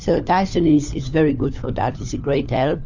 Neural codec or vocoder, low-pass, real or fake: codec, 16 kHz, 4 kbps, FunCodec, trained on LibriTTS, 50 frames a second; 7.2 kHz; fake